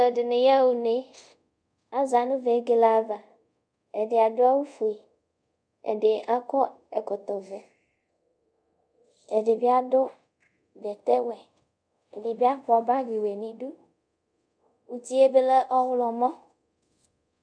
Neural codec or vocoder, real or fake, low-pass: codec, 24 kHz, 0.5 kbps, DualCodec; fake; 9.9 kHz